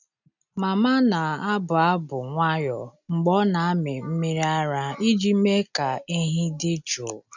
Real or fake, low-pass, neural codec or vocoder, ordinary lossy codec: real; 7.2 kHz; none; none